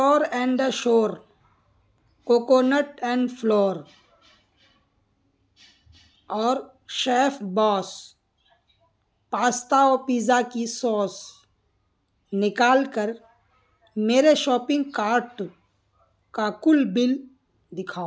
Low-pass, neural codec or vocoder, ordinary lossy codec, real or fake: none; none; none; real